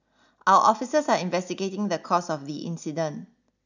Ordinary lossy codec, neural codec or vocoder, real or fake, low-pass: none; none; real; 7.2 kHz